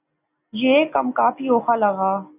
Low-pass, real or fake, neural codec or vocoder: 3.6 kHz; real; none